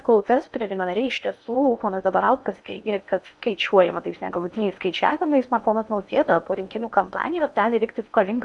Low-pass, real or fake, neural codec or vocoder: 10.8 kHz; fake; codec, 16 kHz in and 24 kHz out, 0.8 kbps, FocalCodec, streaming, 65536 codes